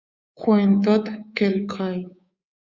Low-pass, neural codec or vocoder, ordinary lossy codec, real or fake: 7.2 kHz; codec, 24 kHz, 3.1 kbps, DualCodec; Opus, 64 kbps; fake